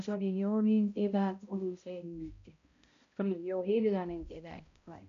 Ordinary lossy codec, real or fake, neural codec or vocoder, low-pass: MP3, 48 kbps; fake; codec, 16 kHz, 0.5 kbps, X-Codec, HuBERT features, trained on balanced general audio; 7.2 kHz